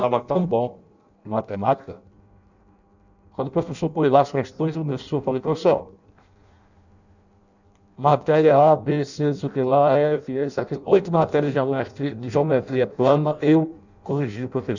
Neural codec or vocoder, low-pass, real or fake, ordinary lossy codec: codec, 16 kHz in and 24 kHz out, 0.6 kbps, FireRedTTS-2 codec; 7.2 kHz; fake; none